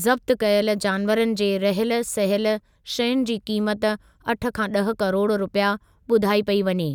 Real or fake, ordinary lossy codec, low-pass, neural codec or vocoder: real; none; 19.8 kHz; none